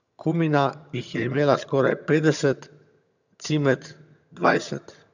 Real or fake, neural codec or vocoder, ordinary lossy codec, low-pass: fake; vocoder, 22.05 kHz, 80 mel bands, HiFi-GAN; none; 7.2 kHz